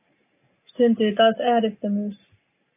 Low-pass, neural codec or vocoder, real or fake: 3.6 kHz; none; real